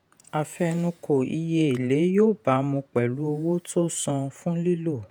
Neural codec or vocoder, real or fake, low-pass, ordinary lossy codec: vocoder, 48 kHz, 128 mel bands, Vocos; fake; none; none